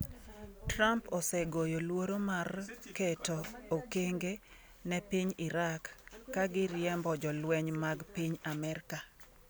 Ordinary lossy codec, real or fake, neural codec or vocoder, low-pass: none; real; none; none